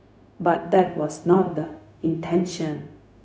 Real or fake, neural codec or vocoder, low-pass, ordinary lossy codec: fake; codec, 16 kHz, 0.4 kbps, LongCat-Audio-Codec; none; none